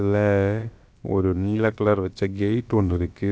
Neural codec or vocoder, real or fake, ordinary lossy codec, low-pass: codec, 16 kHz, about 1 kbps, DyCAST, with the encoder's durations; fake; none; none